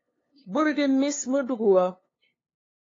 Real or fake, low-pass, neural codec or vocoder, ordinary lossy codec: fake; 7.2 kHz; codec, 16 kHz, 2 kbps, FunCodec, trained on LibriTTS, 25 frames a second; AAC, 32 kbps